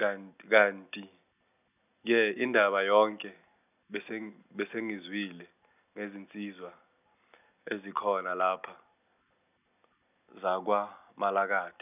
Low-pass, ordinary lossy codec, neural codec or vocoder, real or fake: 3.6 kHz; none; none; real